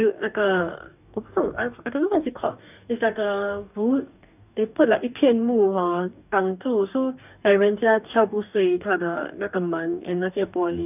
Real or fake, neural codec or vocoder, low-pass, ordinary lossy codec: fake; codec, 44.1 kHz, 2.6 kbps, DAC; 3.6 kHz; none